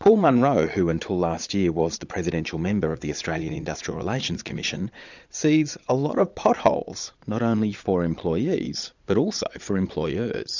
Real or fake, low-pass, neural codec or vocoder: fake; 7.2 kHz; vocoder, 22.05 kHz, 80 mel bands, Vocos